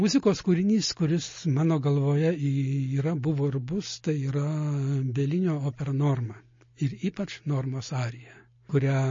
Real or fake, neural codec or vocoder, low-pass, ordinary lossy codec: real; none; 7.2 kHz; MP3, 32 kbps